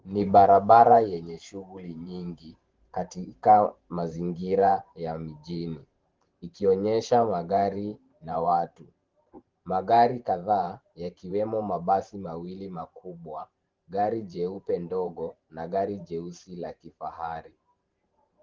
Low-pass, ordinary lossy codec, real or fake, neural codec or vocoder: 7.2 kHz; Opus, 16 kbps; fake; vocoder, 44.1 kHz, 128 mel bands every 512 samples, BigVGAN v2